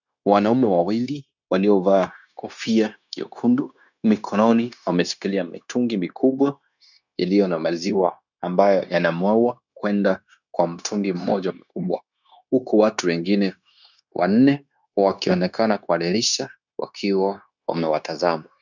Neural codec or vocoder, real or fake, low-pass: codec, 16 kHz, 0.9 kbps, LongCat-Audio-Codec; fake; 7.2 kHz